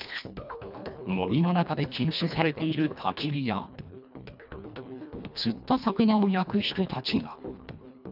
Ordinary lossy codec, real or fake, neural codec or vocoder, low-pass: none; fake; codec, 24 kHz, 1.5 kbps, HILCodec; 5.4 kHz